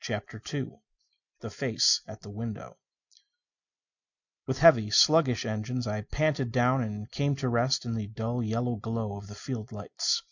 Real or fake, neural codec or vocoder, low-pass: real; none; 7.2 kHz